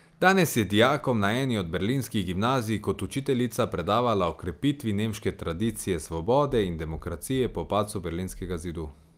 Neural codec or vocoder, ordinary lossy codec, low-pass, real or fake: none; Opus, 32 kbps; 14.4 kHz; real